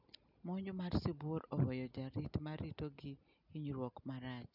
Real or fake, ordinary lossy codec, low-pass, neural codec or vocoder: real; none; 5.4 kHz; none